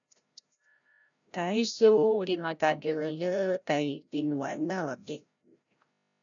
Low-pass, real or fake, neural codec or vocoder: 7.2 kHz; fake; codec, 16 kHz, 0.5 kbps, FreqCodec, larger model